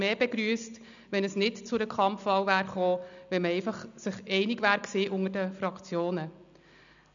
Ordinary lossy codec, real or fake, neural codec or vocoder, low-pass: none; real; none; 7.2 kHz